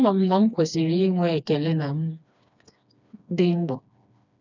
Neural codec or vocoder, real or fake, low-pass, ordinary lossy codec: codec, 16 kHz, 2 kbps, FreqCodec, smaller model; fake; 7.2 kHz; none